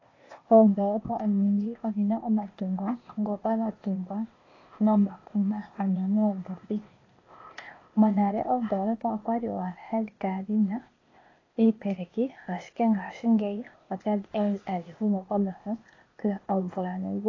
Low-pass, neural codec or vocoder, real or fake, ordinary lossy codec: 7.2 kHz; codec, 16 kHz, 0.8 kbps, ZipCodec; fake; AAC, 32 kbps